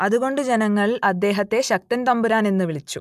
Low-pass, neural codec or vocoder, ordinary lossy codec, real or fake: 14.4 kHz; none; AAC, 96 kbps; real